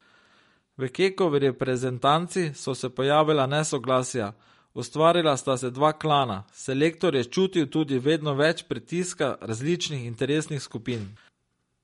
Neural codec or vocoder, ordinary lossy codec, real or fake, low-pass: none; MP3, 48 kbps; real; 19.8 kHz